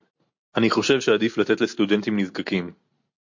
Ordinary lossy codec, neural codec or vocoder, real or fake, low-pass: MP3, 48 kbps; none; real; 7.2 kHz